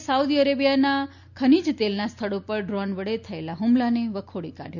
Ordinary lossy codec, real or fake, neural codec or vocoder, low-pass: none; real; none; 7.2 kHz